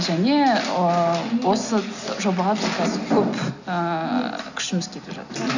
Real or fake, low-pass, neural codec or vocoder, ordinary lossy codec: real; 7.2 kHz; none; none